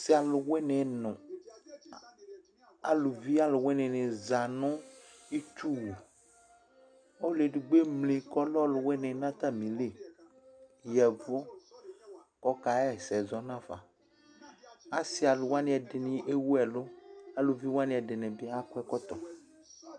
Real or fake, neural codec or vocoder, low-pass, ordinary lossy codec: real; none; 9.9 kHz; MP3, 96 kbps